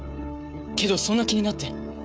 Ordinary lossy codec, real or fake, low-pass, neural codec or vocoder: none; fake; none; codec, 16 kHz, 16 kbps, FreqCodec, smaller model